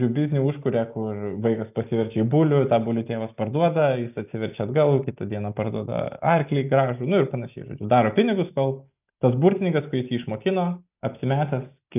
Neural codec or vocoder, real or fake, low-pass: none; real; 3.6 kHz